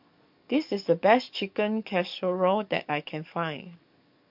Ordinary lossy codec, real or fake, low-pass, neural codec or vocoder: MP3, 48 kbps; fake; 5.4 kHz; codec, 44.1 kHz, 7.8 kbps, DAC